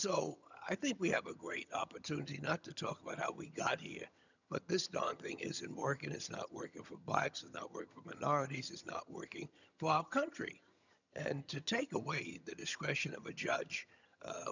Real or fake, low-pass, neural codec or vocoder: fake; 7.2 kHz; vocoder, 22.05 kHz, 80 mel bands, HiFi-GAN